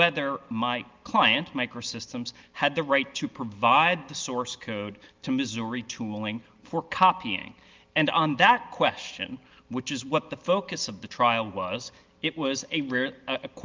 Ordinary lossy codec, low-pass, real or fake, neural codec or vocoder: Opus, 32 kbps; 7.2 kHz; real; none